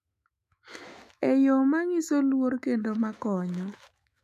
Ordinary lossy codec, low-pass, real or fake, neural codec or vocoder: none; 14.4 kHz; fake; autoencoder, 48 kHz, 128 numbers a frame, DAC-VAE, trained on Japanese speech